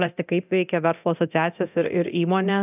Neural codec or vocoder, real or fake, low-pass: codec, 24 kHz, 0.9 kbps, DualCodec; fake; 3.6 kHz